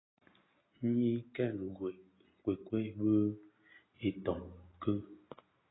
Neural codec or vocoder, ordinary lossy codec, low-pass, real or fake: none; AAC, 16 kbps; 7.2 kHz; real